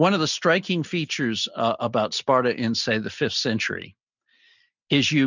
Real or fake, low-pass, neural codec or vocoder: real; 7.2 kHz; none